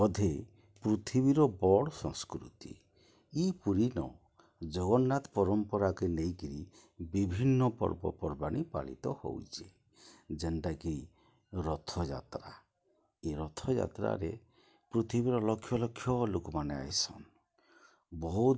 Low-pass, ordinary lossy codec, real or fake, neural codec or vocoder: none; none; real; none